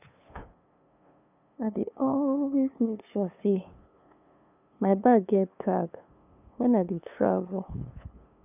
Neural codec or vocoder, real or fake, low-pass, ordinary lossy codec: codec, 16 kHz, 2 kbps, FunCodec, trained on LibriTTS, 25 frames a second; fake; 3.6 kHz; none